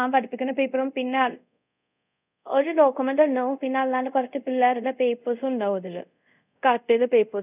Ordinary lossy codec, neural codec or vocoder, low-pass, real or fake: none; codec, 24 kHz, 0.5 kbps, DualCodec; 3.6 kHz; fake